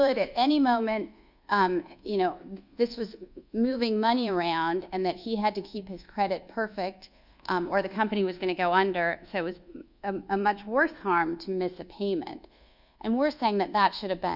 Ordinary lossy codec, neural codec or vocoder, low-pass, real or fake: Opus, 64 kbps; codec, 24 kHz, 1.2 kbps, DualCodec; 5.4 kHz; fake